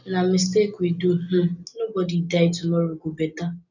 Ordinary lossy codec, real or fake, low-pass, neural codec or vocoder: none; real; 7.2 kHz; none